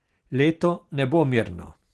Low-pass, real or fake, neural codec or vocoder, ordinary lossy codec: 10.8 kHz; real; none; Opus, 16 kbps